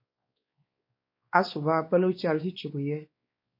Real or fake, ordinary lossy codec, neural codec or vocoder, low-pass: fake; MP3, 32 kbps; codec, 16 kHz, 2 kbps, X-Codec, WavLM features, trained on Multilingual LibriSpeech; 5.4 kHz